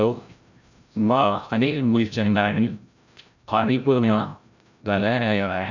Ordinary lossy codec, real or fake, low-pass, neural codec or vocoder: none; fake; 7.2 kHz; codec, 16 kHz, 0.5 kbps, FreqCodec, larger model